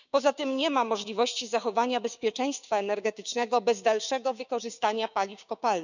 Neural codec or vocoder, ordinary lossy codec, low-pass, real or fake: codec, 16 kHz, 6 kbps, DAC; none; 7.2 kHz; fake